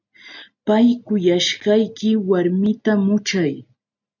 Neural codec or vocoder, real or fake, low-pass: none; real; 7.2 kHz